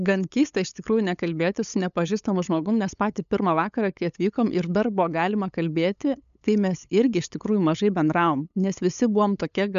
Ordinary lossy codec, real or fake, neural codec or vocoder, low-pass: MP3, 96 kbps; fake; codec, 16 kHz, 8 kbps, FunCodec, trained on LibriTTS, 25 frames a second; 7.2 kHz